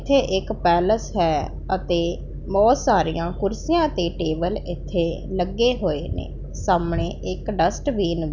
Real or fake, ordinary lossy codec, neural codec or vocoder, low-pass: real; none; none; 7.2 kHz